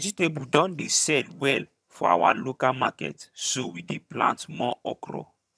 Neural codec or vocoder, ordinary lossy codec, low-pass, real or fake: vocoder, 22.05 kHz, 80 mel bands, HiFi-GAN; none; none; fake